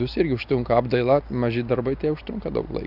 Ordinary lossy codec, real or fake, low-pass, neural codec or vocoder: AAC, 48 kbps; real; 5.4 kHz; none